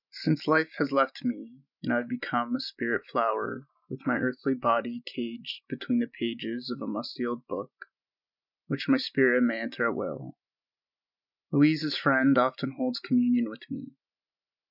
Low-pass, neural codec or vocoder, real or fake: 5.4 kHz; none; real